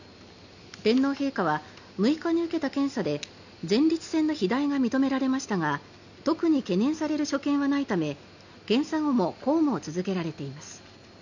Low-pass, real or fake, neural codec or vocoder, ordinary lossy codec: 7.2 kHz; real; none; none